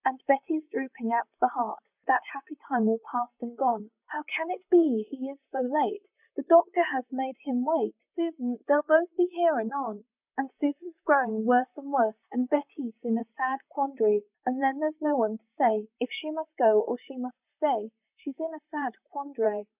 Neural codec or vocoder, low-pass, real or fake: vocoder, 44.1 kHz, 128 mel bands, Pupu-Vocoder; 3.6 kHz; fake